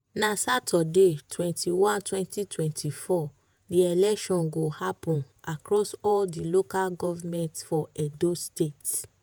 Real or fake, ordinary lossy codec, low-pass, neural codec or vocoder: fake; none; none; vocoder, 48 kHz, 128 mel bands, Vocos